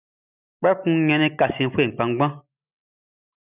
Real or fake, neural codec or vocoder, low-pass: real; none; 3.6 kHz